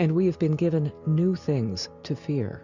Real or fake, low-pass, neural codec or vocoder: real; 7.2 kHz; none